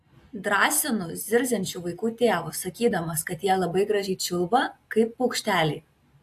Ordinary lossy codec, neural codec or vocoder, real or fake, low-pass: AAC, 64 kbps; none; real; 14.4 kHz